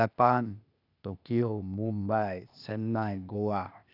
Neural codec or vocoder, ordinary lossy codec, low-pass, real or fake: codec, 16 kHz, 0.8 kbps, ZipCodec; none; 5.4 kHz; fake